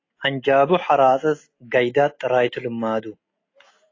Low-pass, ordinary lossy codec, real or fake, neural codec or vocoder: 7.2 kHz; AAC, 32 kbps; real; none